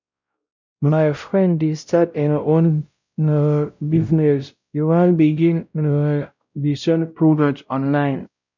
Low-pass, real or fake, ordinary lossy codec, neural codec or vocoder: 7.2 kHz; fake; none; codec, 16 kHz, 0.5 kbps, X-Codec, WavLM features, trained on Multilingual LibriSpeech